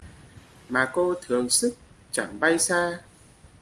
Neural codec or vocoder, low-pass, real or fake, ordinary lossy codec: none; 10.8 kHz; real; Opus, 32 kbps